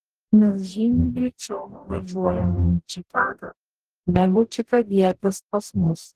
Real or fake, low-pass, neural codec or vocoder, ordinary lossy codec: fake; 14.4 kHz; codec, 44.1 kHz, 0.9 kbps, DAC; Opus, 16 kbps